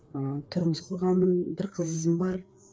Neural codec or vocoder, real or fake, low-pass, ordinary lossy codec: codec, 16 kHz, 4 kbps, FreqCodec, larger model; fake; none; none